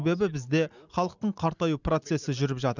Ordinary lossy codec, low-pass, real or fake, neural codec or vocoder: none; 7.2 kHz; real; none